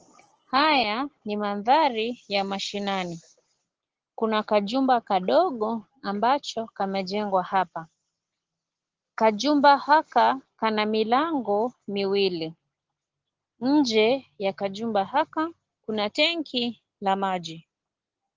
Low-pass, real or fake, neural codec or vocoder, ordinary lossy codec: 7.2 kHz; real; none; Opus, 16 kbps